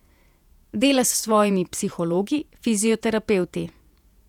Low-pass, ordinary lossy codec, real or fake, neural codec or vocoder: 19.8 kHz; none; fake; vocoder, 48 kHz, 128 mel bands, Vocos